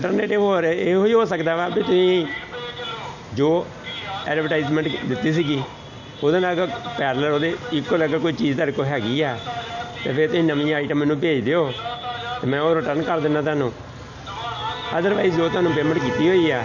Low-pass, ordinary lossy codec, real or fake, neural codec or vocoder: 7.2 kHz; none; real; none